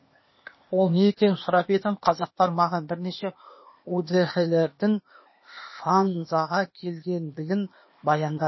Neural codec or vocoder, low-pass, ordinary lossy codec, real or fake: codec, 16 kHz, 0.8 kbps, ZipCodec; 7.2 kHz; MP3, 24 kbps; fake